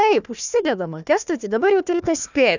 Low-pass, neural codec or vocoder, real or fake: 7.2 kHz; codec, 16 kHz, 1 kbps, FunCodec, trained on Chinese and English, 50 frames a second; fake